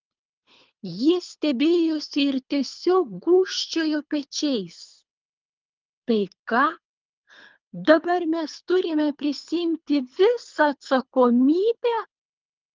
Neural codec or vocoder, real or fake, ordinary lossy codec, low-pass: codec, 24 kHz, 3 kbps, HILCodec; fake; Opus, 24 kbps; 7.2 kHz